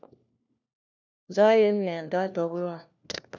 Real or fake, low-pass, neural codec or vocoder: fake; 7.2 kHz; codec, 16 kHz, 1 kbps, FunCodec, trained on LibriTTS, 50 frames a second